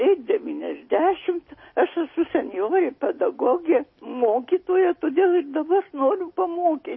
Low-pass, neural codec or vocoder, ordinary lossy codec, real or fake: 7.2 kHz; none; MP3, 24 kbps; real